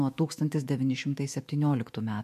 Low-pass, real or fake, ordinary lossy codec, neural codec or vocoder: 14.4 kHz; fake; MP3, 64 kbps; autoencoder, 48 kHz, 128 numbers a frame, DAC-VAE, trained on Japanese speech